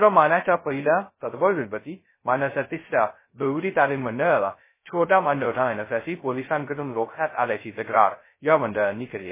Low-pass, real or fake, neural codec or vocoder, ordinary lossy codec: 3.6 kHz; fake; codec, 16 kHz, 0.2 kbps, FocalCodec; MP3, 16 kbps